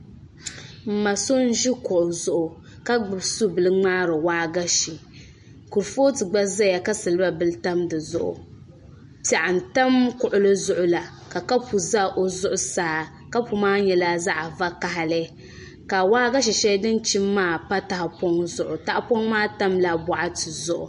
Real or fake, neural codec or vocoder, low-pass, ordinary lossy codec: real; none; 10.8 kHz; MP3, 48 kbps